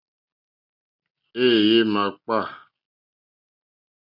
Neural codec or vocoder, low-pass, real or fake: none; 5.4 kHz; real